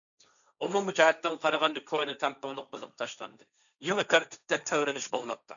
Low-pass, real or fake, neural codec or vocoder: 7.2 kHz; fake; codec, 16 kHz, 1.1 kbps, Voila-Tokenizer